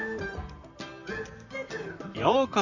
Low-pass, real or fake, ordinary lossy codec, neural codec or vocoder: 7.2 kHz; fake; none; vocoder, 44.1 kHz, 128 mel bands, Pupu-Vocoder